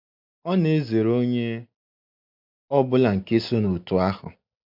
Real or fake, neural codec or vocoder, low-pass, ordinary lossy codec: real; none; 5.4 kHz; MP3, 48 kbps